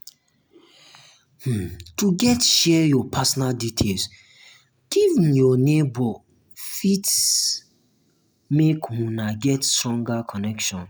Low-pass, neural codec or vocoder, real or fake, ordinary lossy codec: none; none; real; none